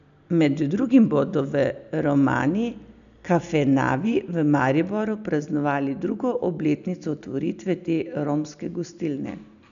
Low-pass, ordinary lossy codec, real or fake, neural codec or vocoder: 7.2 kHz; none; real; none